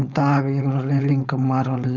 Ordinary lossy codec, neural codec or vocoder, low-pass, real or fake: none; codec, 16 kHz, 4.8 kbps, FACodec; 7.2 kHz; fake